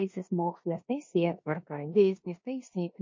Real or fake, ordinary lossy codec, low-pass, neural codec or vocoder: fake; MP3, 32 kbps; 7.2 kHz; codec, 16 kHz in and 24 kHz out, 0.9 kbps, LongCat-Audio-Codec, four codebook decoder